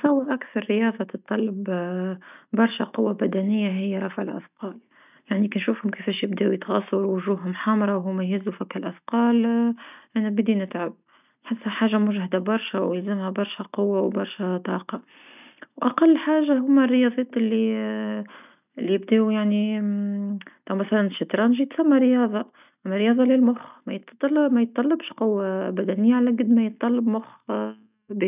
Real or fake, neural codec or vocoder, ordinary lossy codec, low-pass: real; none; none; 3.6 kHz